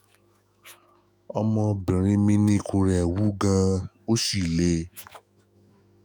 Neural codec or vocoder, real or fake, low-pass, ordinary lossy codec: autoencoder, 48 kHz, 128 numbers a frame, DAC-VAE, trained on Japanese speech; fake; none; none